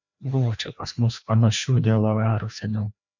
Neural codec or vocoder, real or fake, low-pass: codec, 16 kHz, 1 kbps, FreqCodec, larger model; fake; 7.2 kHz